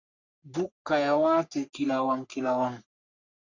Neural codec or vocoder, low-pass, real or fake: codec, 44.1 kHz, 3.4 kbps, Pupu-Codec; 7.2 kHz; fake